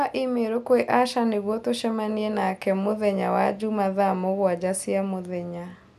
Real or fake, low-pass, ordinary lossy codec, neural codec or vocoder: real; 14.4 kHz; none; none